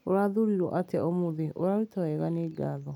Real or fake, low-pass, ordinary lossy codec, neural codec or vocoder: real; 19.8 kHz; none; none